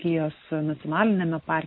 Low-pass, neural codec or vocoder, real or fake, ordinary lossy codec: 7.2 kHz; none; real; MP3, 24 kbps